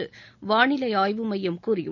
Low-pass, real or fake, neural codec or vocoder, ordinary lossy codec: 7.2 kHz; real; none; none